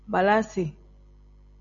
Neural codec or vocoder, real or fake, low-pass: none; real; 7.2 kHz